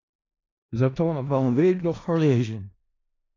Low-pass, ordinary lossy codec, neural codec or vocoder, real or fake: 7.2 kHz; AAC, 32 kbps; codec, 16 kHz in and 24 kHz out, 0.4 kbps, LongCat-Audio-Codec, four codebook decoder; fake